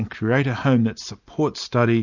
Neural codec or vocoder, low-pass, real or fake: none; 7.2 kHz; real